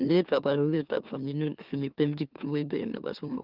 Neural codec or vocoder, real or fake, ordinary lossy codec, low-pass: autoencoder, 44.1 kHz, a latent of 192 numbers a frame, MeloTTS; fake; Opus, 32 kbps; 5.4 kHz